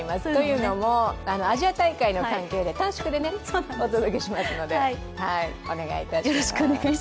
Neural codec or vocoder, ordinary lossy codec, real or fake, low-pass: none; none; real; none